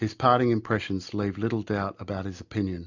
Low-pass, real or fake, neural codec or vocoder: 7.2 kHz; real; none